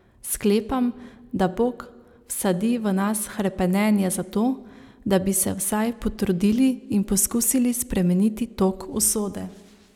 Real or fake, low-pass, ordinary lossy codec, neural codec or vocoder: real; 19.8 kHz; none; none